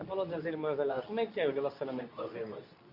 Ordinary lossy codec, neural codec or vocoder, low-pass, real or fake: none; codec, 24 kHz, 0.9 kbps, WavTokenizer, medium speech release version 2; 5.4 kHz; fake